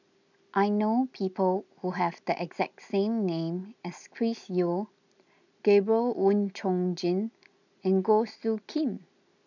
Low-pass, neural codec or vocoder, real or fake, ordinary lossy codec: 7.2 kHz; none; real; none